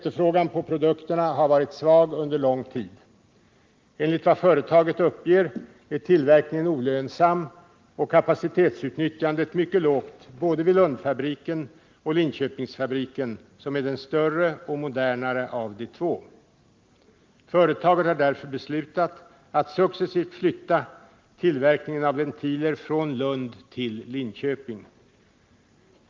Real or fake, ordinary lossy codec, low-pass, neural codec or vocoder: real; Opus, 32 kbps; 7.2 kHz; none